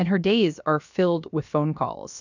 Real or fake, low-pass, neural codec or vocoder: fake; 7.2 kHz; codec, 16 kHz, about 1 kbps, DyCAST, with the encoder's durations